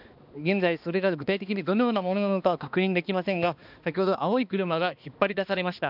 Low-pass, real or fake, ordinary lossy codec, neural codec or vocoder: 5.4 kHz; fake; none; codec, 16 kHz, 2 kbps, X-Codec, HuBERT features, trained on balanced general audio